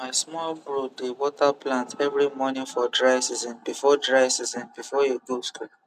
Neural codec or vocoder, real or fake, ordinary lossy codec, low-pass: none; real; none; 14.4 kHz